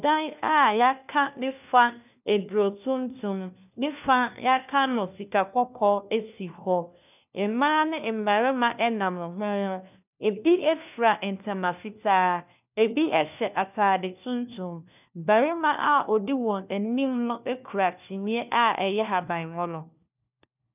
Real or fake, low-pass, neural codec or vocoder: fake; 3.6 kHz; codec, 16 kHz, 1 kbps, FunCodec, trained on LibriTTS, 50 frames a second